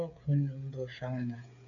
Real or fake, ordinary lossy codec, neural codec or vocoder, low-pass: fake; MP3, 96 kbps; codec, 16 kHz, 8 kbps, FreqCodec, smaller model; 7.2 kHz